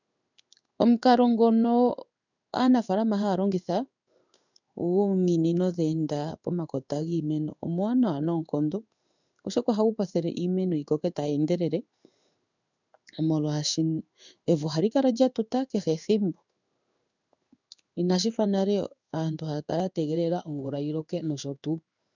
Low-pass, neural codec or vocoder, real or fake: 7.2 kHz; codec, 16 kHz in and 24 kHz out, 1 kbps, XY-Tokenizer; fake